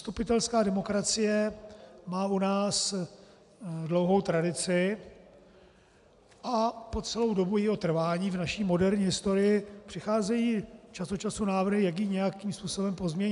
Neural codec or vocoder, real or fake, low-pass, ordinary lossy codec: none; real; 10.8 kHz; AAC, 96 kbps